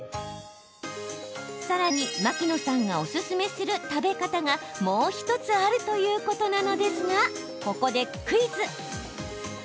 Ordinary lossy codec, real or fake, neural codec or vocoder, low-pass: none; real; none; none